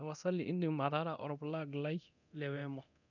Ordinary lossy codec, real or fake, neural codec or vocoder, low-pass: none; fake; codec, 24 kHz, 0.9 kbps, DualCodec; 7.2 kHz